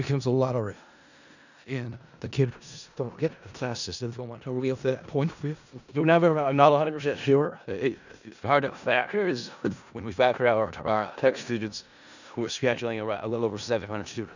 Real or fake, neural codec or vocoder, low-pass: fake; codec, 16 kHz in and 24 kHz out, 0.4 kbps, LongCat-Audio-Codec, four codebook decoder; 7.2 kHz